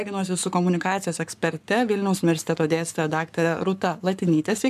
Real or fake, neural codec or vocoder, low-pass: fake; codec, 44.1 kHz, 7.8 kbps, Pupu-Codec; 14.4 kHz